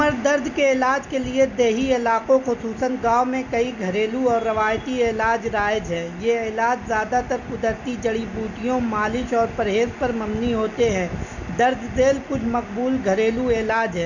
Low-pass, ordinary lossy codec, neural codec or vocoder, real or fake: 7.2 kHz; none; none; real